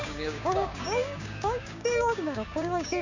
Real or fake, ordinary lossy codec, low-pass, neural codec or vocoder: fake; none; 7.2 kHz; codec, 16 kHz, 6 kbps, DAC